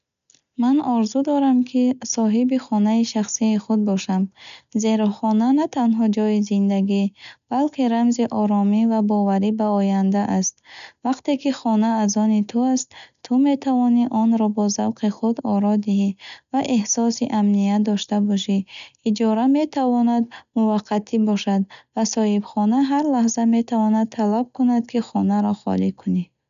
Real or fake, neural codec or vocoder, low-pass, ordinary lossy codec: real; none; 7.2 kHz; none